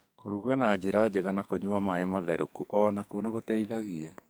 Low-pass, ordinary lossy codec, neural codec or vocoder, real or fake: none; none; codec, 44.1 kHz, 2.6 kbps, SNAC; fake